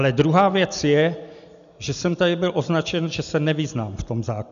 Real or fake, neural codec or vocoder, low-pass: real; none; 7.2 kHz